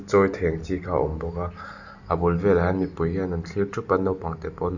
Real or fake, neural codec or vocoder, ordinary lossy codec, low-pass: real; none; none; 7.2 kHz